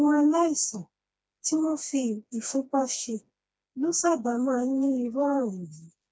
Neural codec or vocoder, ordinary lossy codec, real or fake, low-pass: codec, 16 kHz, 2 kbps, FreqCodec, smaller model; none; fake; none